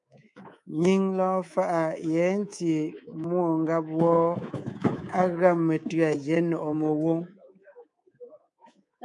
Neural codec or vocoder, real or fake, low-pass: codec, 24 kHz, 3.1 kbps, DualCodec; fake; 10.8 kHz